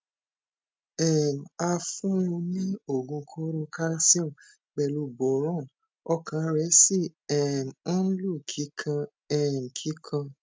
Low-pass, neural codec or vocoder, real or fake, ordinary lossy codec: none; none; real; none